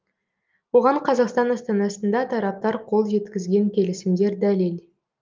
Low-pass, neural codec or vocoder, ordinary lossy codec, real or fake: 7.2 kHz; none; Opus, 24 kbps; real